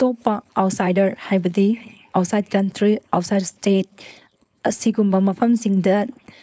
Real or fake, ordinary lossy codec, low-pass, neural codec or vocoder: fake; none; none; codec, 16 kHz, 4.8 kbps, FACodec